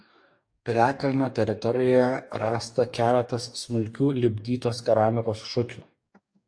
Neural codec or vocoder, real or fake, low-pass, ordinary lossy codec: codec, 44.1 kHz, 2.6 kbps, DAC; fake; 9.9 kHz; AAC, 64 kbps